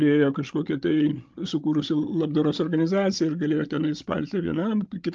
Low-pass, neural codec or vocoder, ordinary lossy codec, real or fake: 7.2 kHz; codec, 16 kHz, 16 kbps, FreqCodec, larger model; Opus, 32 kbps; fake